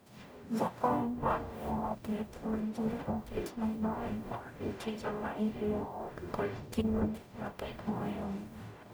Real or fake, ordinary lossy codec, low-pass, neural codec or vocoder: fake; none; none; codec, 44.1 kHz, 0.9 kbps, DAC